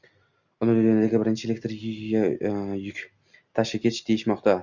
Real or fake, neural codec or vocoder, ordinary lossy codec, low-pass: real; none; Opus, 64 kbps; 7.2 kHz